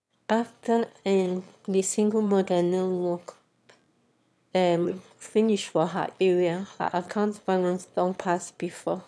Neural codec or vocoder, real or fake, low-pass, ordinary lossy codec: autoencoder, 22.05 kHz, a latent of 192 numbers a frame, VITS, trained on one speaker; fake; none; none